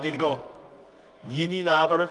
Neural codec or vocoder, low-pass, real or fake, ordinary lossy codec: codec, 24 kHz, 0.9 kbps, WavTokenizer, medium music audio release; 10.8 kHz; fake; Opus, 32 kbps